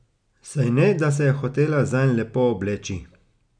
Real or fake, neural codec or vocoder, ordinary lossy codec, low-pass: real; none; none; 9.9 kHz